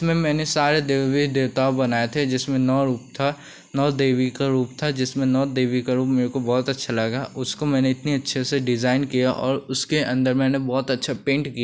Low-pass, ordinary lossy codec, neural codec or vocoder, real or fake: none; none; none; real